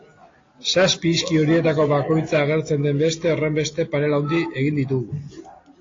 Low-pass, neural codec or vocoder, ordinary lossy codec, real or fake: 7.2 kHz; none; AAC, 32 kbps; real